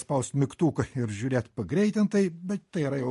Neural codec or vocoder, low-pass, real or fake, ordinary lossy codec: none; 14.4 kHz; real; MP3, 48 kbps